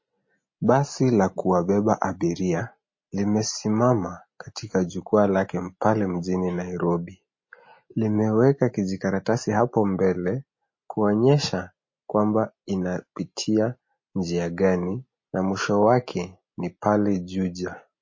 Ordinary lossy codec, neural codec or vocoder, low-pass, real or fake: MP3, 32 kbps; none; 7.2 kHz; real